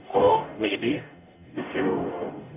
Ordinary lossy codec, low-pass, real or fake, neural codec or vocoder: none; 3.6 kHz; fake; codec, 44.1 kHz, 0.9 kbps, DAC